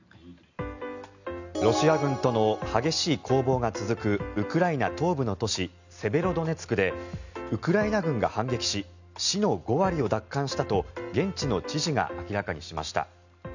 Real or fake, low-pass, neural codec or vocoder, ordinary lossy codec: real; 7.2 kHz; none; none